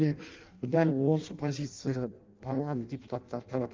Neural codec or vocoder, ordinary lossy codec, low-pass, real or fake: codec, 16 kHz in and 24 kHz out, 0.6 kbps, FireRedTTS-2 codec; Opus, 32 kbps; 7.2 kHz; fake